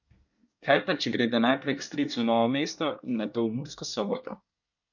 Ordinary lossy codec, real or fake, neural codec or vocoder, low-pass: none; fake; codec, 24 kHz, 1 kbps, SNAC; 7.2 kHz